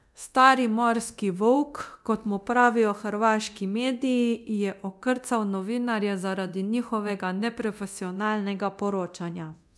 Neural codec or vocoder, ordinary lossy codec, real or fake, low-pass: codec, 24 kHz, 0.9 kbps, DualCodec; none; fake; none